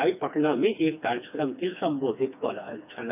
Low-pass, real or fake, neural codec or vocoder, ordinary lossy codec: 3.6 kHz; fake; codec, 16 kHz, 2 kbps, FreqCodec, smaller model; none